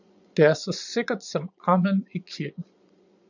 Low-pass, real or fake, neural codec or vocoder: 7.2 kHz; fake; vocoder, 22.05 kHz, 80 mel bands, Vocos